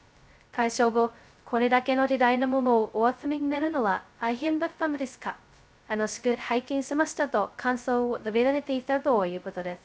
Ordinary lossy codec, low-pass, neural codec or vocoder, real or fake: none; none; codec, 16 kHz, 0.2 kbps, FocalCodec; fake